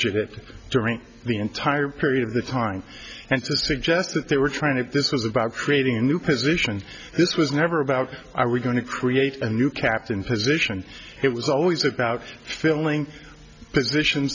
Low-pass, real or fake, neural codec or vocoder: 7.2 kHz; real; none